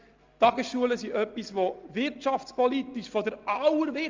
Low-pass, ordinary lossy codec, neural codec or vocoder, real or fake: 7.2 kHz; Opus, 32 kbps; none; real